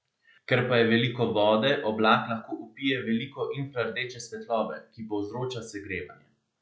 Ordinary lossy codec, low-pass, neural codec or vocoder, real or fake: none; none; none; real